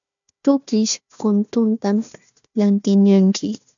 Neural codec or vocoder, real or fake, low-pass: codec, 16 kHz, 1 kbps, FunCodec, trained on Chinese and English, 50 frames a second; fake; 7.2 kHz